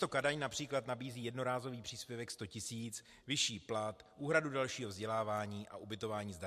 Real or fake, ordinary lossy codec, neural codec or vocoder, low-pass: real; MP3, 64 kbps; none; 14.4 kHz